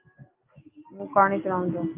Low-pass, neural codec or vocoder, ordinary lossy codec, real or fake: 3.6 kHz; none; Opus, 24 kbps; real